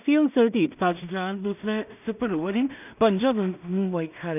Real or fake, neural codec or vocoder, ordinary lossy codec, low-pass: fake; codec, 16 kHz in and 24 kHz out, 0.4 kbps, LongCat-Audio-Codec, two codebook decoder; none; 3.6 kHz